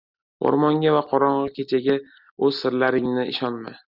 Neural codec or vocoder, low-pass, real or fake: none; 5.4 kHz; real